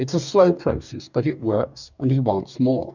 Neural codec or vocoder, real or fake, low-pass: codec, 44.1 kHz, 2.6 kbps, DAC; fake; 7.2 kHz